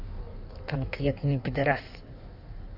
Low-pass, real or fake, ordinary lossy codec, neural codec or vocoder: 5.4 kHz; fake; none; codec, 16 kHz in and 24 kHz out, 1.1 kbps, FireRedTTS-2 codec